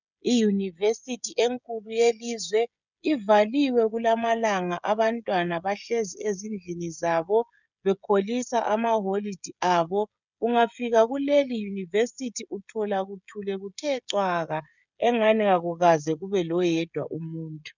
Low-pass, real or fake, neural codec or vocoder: 7.2 kHz; fake; codec, 16 kHz, 16 kbps, FreqCodec, smaller model